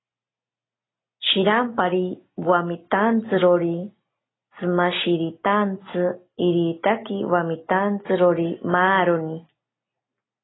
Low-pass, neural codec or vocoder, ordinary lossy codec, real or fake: 7.2 kHz; none; AAC, 16 kbps; real